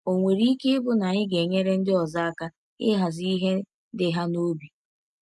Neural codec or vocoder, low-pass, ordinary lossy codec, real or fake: none; none; none; real